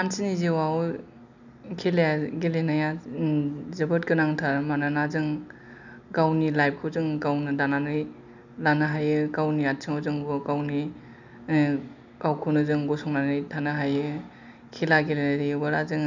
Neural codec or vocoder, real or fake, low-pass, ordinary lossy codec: none; real; 7.2 kHz; none